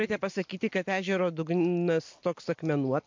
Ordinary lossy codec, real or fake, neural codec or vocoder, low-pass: MP3, 48 kbps; real; none; 7.2 kHz